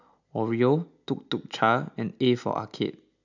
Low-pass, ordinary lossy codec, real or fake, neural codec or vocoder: 7.2 kHz; none; real; none